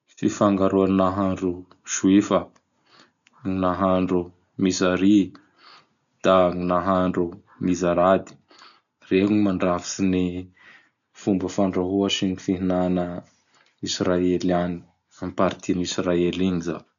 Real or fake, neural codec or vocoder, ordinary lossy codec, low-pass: real; none; none; 7.2 kHz